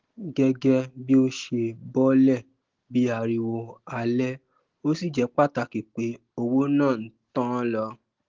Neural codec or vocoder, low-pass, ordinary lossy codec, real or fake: none; 7.2 kHz; Opus, 16 kbps; real